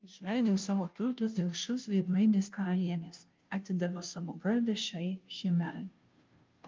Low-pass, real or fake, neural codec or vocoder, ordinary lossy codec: 7.2 kHz; fake; codec, 16 kHz, 0.5 kbps, FunCodec, trained on Chinese and English, 25 frames a second; Opus, 24 kbps